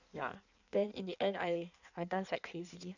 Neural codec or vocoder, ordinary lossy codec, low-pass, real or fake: codec, 16 kHz in and 24 kHz out, 1.1 kbps, FireRedTTS-2 codec; none; 7.2 kHz; fake